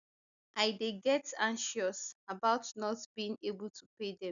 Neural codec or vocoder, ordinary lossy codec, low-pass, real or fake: none; none; 7.2 kHz; real